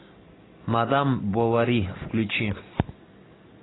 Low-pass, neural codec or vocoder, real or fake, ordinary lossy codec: 7.2 kHz; none; real; AAC, 16 kbps